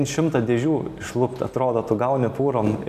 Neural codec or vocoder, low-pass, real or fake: none; 14.4 kHz; real